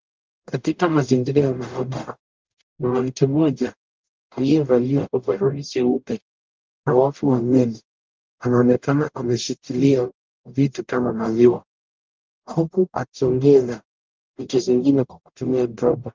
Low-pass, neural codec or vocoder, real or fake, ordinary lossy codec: 7.2 kHz; codec, 44.1 kHz, 0.9 kbps, DAC; fake; Opus, 32 kbps